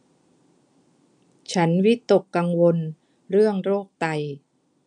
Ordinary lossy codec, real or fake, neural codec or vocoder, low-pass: none; real; none; 9.9 kHz